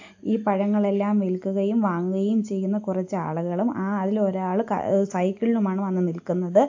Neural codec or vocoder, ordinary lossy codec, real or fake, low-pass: none; AAC, 48 kbps; real; 7.2 kHz